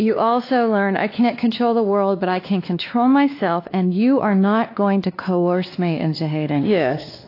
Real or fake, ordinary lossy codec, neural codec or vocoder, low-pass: fake; AAC, 32 kbps; codec, 16 kHz, 2 kbps, X-Codec, WavLM features, trained on Multilingual LibriSpeech; 5.4 kHz